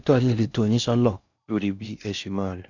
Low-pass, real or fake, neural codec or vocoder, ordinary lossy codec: 7.2 kHz; fake; codec, 16 kHz in and 24 kHz out, 0.6 kbps, FocalCodec, streaming, 4096 codes; none